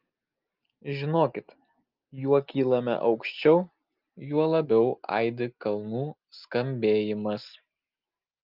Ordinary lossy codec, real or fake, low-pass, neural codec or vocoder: Opus, 24 kbps; real; 5.4 kHz; none